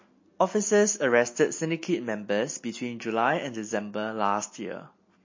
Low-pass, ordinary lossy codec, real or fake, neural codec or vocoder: 7.2 kHz; MP3, 32 kbps; real; none